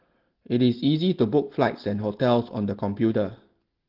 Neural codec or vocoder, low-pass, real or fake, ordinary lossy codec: none; 5.4 kHz; real; Opus, 16 kbps